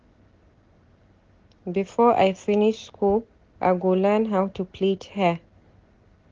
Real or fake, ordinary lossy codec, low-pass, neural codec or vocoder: real; Opus, 16 kbps; 7.2 kHz; none